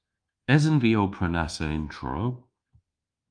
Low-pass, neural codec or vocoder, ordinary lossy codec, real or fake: 9.9 kHz; codec, 24 kHz, 1.2 kbps, DualCodec; Opus, 32 kbps; fake